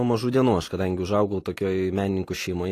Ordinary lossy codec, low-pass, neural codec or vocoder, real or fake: AAC, 48 kbps; 14.4 kHz; none; real